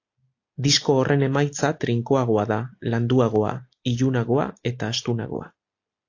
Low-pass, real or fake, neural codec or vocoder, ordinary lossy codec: 7.2 kHz; real; none; AAC, 48 kbps